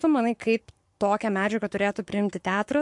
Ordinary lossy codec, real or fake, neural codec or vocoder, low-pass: MP3, 64 kbps; fake; codec, 44.1 kHz, 7.8 kbps, Pupu-Codec; 10.8 kHz